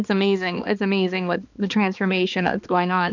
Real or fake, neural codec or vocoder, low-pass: fake; codec, 16 kHz, 2 kbps, X-Codec, HuBERT features, trained on balanced general audio; 7.2 kHz